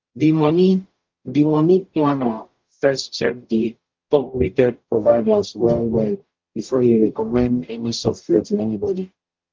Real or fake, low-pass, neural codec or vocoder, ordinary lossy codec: fake; 7.2 kHz; codec, 44.1 kHz, 0.9 kbps, DAC; Opus, 32 kbps